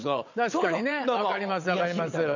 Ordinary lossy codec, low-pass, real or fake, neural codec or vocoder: none; 7.2 kHz; fake; vocoder, 22.05 kHz, 80 mel bands, WaveNeXt